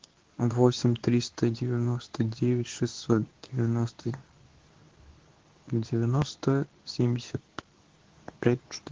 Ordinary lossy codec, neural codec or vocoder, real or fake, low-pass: Opus, 16 kbps; codec, 24 kHz, 0.9 kbps, WavTokenizer, medium speech release version 2; fake; 7.2 kHz